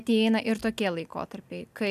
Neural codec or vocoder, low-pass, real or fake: none; 14.4 kHz; real